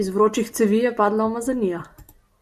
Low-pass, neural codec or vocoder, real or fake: 14.4 kHz; none; real